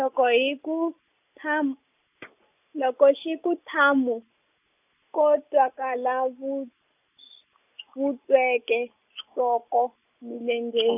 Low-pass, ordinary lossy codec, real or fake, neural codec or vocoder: 3.6 kHz; none; real; none